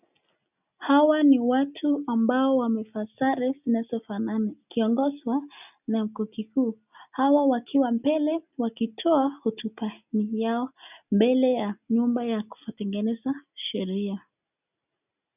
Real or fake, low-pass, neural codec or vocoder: real; 3.6 kHz; none